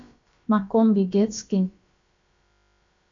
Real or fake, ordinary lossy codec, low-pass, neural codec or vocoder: fake; MP3, 64 kbps; 7.2 kHz; codec, 16 kHz, about 1 kbps, DyCAST, with the encoder's durations